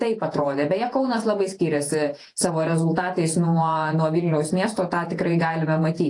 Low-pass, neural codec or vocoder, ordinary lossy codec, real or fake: 10.8 kHz; vocoder, 48 kHz, 128 mel bands, Vocos; AAC, 48 kbps; fake